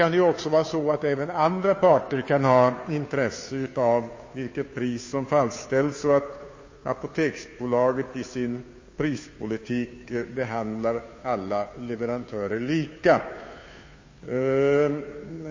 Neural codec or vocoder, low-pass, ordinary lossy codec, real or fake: codec, 16 kHz, 2 kbps, FunCodec, trained on Chinese and English, 25 frames a second; 7.2 kHz; MP3, 32 kbps; fake